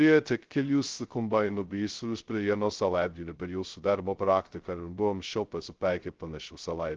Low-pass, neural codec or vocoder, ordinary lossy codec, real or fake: 7.2 kHz; codec, 16 kHz, 0.2 kbps, FocalCodec; Opus, 16 kbps; fake